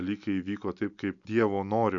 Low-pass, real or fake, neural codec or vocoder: 7.2 kHz; real; none